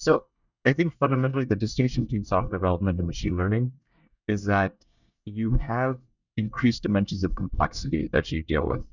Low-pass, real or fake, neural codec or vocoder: 7.2 kHz; fake; codec, 24 kHz, 1 kbps, SNAC